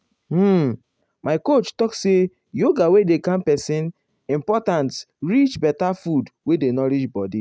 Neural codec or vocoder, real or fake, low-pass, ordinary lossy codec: none; real; none; none